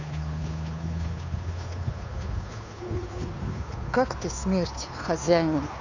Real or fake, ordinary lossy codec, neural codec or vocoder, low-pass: fake; none; codec, 16 kHz, 2 kbps, FunCodec, trained on Chinese and English, 25 frames a second; 7.2 kHz